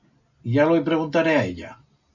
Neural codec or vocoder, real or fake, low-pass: none; real; 7.2 kHz